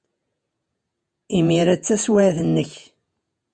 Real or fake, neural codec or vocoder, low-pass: fake; vocoder, 48 kHz, 128 mel bands, Vocos; 9.9 kHz